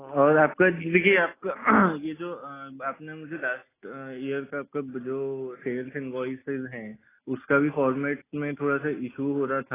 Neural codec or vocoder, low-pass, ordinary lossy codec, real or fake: none; 3.6 kHz; AAC, 16 kbps; real